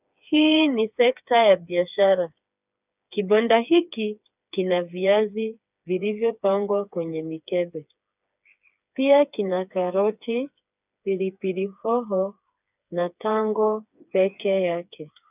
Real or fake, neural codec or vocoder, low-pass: fake; codec, 16 kHz, 4 kbps, FreqCodec, smaller model; 3.6 kHz